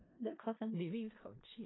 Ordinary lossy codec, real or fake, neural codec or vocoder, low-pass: AAC, 16 kbps; fake; codec, 16 kHz in and 24 kHz out, 0.4 kbps, LongCat-Audio-Codec, four codebook decoder; 7.2 kHz